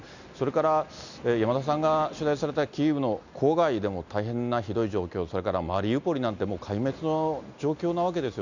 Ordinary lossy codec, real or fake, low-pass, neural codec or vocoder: none; real; 7.2 kHz; none